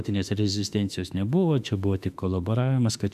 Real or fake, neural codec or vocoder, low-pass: fake; autoencoder, 48 kHz, 32 numbers a frame, DAC-VAE, trained on Japanese speech; 14.4 kHz